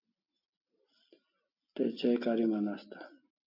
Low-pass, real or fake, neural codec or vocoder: 5.4 kHz; real; none